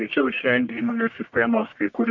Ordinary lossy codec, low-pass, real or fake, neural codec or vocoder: MP3, 64 kbps; 7.2 kHz; fake; codec, 44.1 kHz, 1.7 kbps, Pupu-Codec